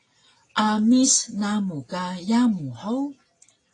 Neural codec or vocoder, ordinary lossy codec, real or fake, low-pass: none; AAC, 32 kbps; real; 10.8 kHz